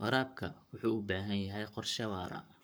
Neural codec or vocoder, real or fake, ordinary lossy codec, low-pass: codec, 44.1 kHz, 7.8 kbps, Pupu-Codec; fake; none; none